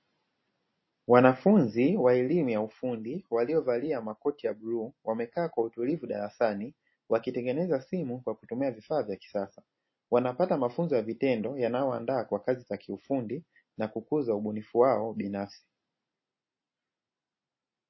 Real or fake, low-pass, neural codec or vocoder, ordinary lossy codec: real; 7.2 kHz; none; MP3, 24 kbps